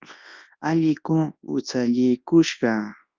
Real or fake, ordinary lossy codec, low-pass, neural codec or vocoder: fake; Opus, 24 kbps; 7.2 kHz; codec, 24 kHz, 0.9 kbps, WavTokenizer, large speech release